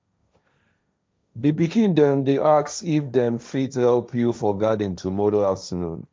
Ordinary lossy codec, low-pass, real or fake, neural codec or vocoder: none; none; fake; codec, 16 kHz, 1.1 kbps, Voila-Tokenizer